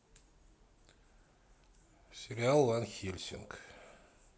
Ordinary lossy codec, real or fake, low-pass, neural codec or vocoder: none; real; none; none